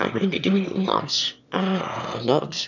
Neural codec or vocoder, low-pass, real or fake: autoencoder, 22.05 kHz, a latent of 192 numbers a frame, VITS, trained on one speaker; 7.2 kHz; fake